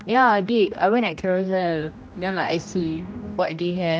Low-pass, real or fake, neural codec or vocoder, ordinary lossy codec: none; fake; codec, 16 kHz, 1 kbps, X-Codec, HuBERT features, trained on general audio; none